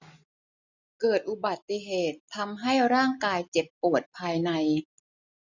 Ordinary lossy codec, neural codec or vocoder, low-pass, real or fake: none; none; 7.2 kHz; real